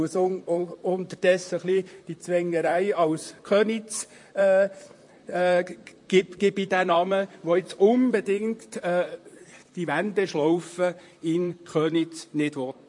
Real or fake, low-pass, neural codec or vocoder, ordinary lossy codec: fake; 10.8 kHz; vocoder, 44.1 kHz, 128 mel bands, Pupu-Vocoder; MP3, 48 kbps